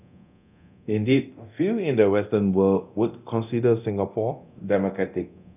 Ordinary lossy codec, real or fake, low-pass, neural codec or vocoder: none; fake; 3.6 kHz; codec, 24 kHz, 0.9 kbps, DualCodec